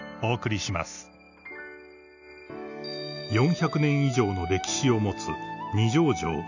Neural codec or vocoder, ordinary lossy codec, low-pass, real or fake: none; none; 7.2 kHz; real